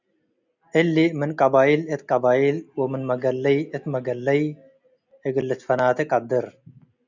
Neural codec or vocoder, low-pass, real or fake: none; 7.2 kHz; real